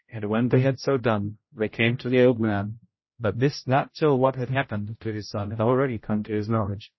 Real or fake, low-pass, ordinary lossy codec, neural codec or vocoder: fake; 7.2 kHz; MP3, 24 kbps; codec, 16 kHz, 0.5 kbps, X-Codec, HuBERT features, trained on general audio